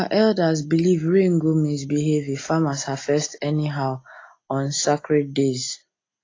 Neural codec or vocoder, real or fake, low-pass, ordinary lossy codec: none; real; 7.2 kHz; AAC, 32 kbps